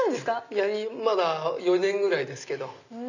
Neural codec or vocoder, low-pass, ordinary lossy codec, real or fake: none; 7.2 kHz; none; real